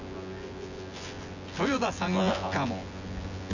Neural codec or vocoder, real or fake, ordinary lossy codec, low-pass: vocoder, 24 kHz, 100 mel bands, Vocos; fake; none; 7.2 kHz